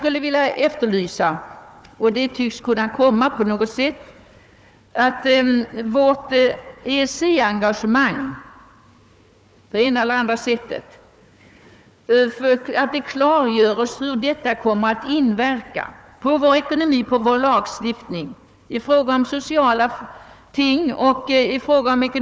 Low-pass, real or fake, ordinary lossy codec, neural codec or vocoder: none; fake; none; codec, 16 kHz, 4 kbps, FunCodec, trained on Chinese and English, 50 frames a second